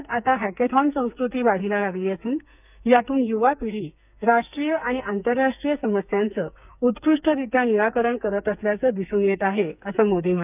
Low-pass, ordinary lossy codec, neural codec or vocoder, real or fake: 3.6 kHz; none; codec, 44.1 kHz, 2.6 kbps, SNAC; fake